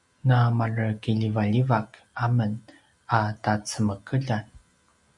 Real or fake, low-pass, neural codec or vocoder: real; 10.8 kHz; none